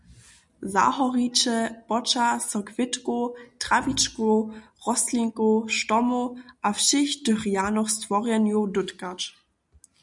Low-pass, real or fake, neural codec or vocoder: 10.8 kHz; real; none